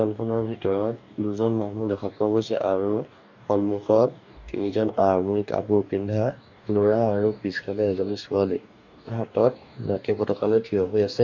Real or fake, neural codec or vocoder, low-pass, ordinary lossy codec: fake; codec, 44.1 kHz, 2.6 kbps, DAC; 7.2 kHz; none